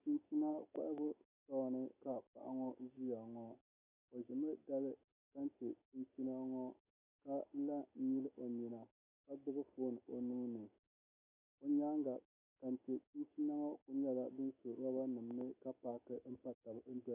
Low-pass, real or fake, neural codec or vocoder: 3.6 kHz; real; none